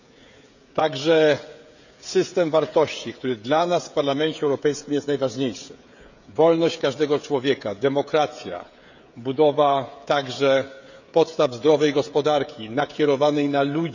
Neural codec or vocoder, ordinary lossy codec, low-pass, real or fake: codec, 16 kHz, 16 kbps, FreqCodec, smaller model; none; 7.2 kHz; fake